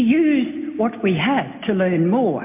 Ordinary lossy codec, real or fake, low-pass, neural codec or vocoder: MP3, 24 kbps; real; 3.6 kHz; none